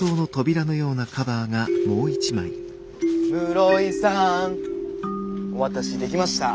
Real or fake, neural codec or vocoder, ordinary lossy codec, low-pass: real; none; none; none